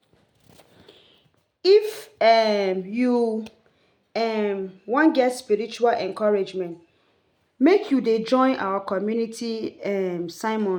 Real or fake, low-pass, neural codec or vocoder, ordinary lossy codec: real; 19.8 kHz; none; MP3, 96 kbps